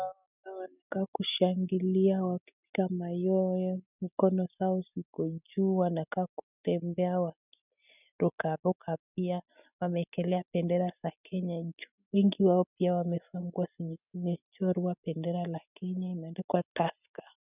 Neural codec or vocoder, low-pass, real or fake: none; 3.6 kHz; real